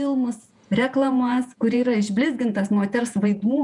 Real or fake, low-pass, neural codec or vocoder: fake; 10.8 kHz; vocoder, 48 kHz, 128 mel bands, Vocos